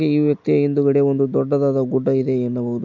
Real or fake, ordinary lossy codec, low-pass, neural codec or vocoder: real; none; 7.2 kHz; none